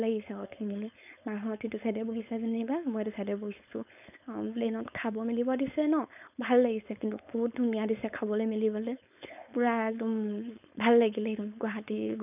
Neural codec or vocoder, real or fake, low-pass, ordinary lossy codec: codec, 16 kHz, 4.8 kbps, FACodec; fake; 3.6 kHz; none